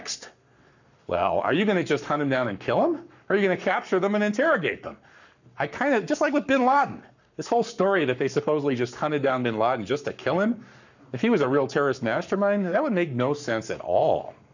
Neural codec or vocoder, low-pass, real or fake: codec, 44.1 kHz, 7.8 kbps, Pupu-Codec; 7.2 kHz; fake